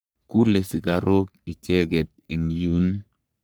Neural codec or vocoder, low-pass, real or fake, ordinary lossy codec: codec, 44.1 kHz, 3.4 kbps, Pupu-Codec; none; fake; none